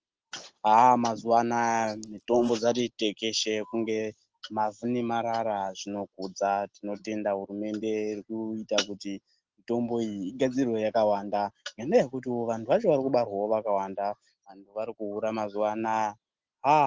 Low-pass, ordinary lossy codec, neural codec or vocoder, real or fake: 7.2 kHz; Opus, 16 kbps; none; real